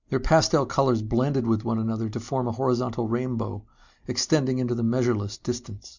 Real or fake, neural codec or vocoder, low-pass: real; none; 7.2 kHz